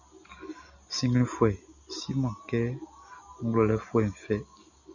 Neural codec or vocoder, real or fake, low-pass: none; real; 7.2 kHz